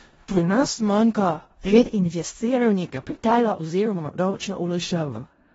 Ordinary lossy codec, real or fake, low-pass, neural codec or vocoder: AAC, 24 kbps; fake; 10.8 kHz; codec, 16 kHz in and 24 kHz out, 0.4 kbps, LongCat-Audio-Codec, four codebook decoder